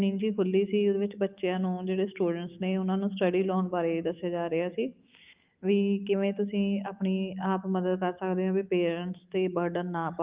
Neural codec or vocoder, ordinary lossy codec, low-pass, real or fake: autoencoder, 48 kHz, 128 numbers a frame, DAC-VAE, trained on Japanese speech; Opus, 32 kbps; 3.6 kHz; fake